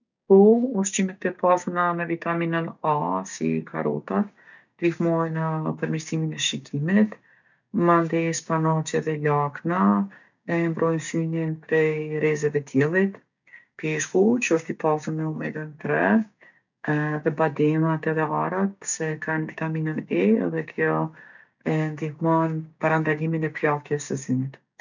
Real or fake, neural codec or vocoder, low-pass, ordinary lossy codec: fake; codec, 16 kHz, 6 kbps, DAC; 7.2 kHz; none